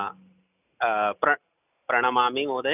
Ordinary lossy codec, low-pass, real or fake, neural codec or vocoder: none; 3.6 kHz; real; none